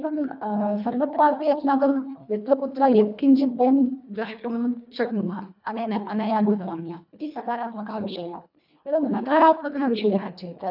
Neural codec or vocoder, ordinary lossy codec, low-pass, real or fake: codec, 24 kHz, 1.5 kbps, HILCodec; none; 5.4 kHz; fake